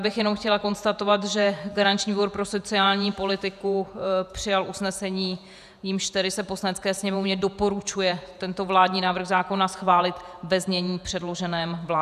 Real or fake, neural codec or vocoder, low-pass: fake; vocoder, 48 kHz, 128 mel bands, Vocos; 14.4 kHz